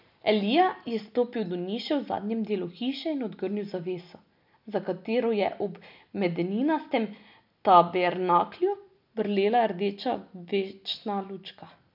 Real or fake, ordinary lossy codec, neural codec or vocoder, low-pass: real; none; none; 5.4 kHz